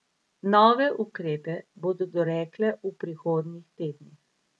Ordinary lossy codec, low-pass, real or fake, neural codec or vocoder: none; none; real; none